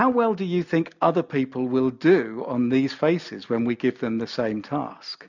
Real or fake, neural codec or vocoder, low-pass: real; none; 7.2 kHz